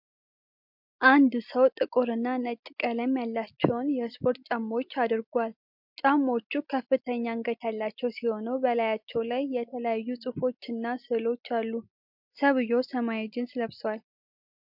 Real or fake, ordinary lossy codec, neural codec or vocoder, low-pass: real; MP3, 48 kbps; none; 5.4 kHz